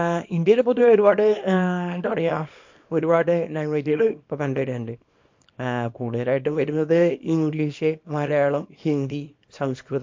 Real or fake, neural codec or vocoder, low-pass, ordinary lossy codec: fake; codec, 24 kHz, 0.9 kbps, WavTokenizer, small release; 7.2 kHz; MP3, 48 kbps